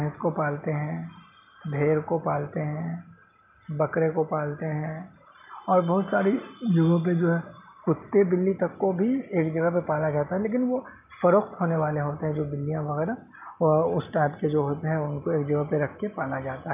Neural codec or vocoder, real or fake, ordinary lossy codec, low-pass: none; real; none; 3.6 kHz